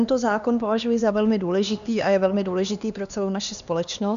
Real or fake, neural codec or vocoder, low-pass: fake; codec, 16 kHz, 2 kbps, X-Codec, HuBERT features, trained on LibriSpeech; 7.2 kHz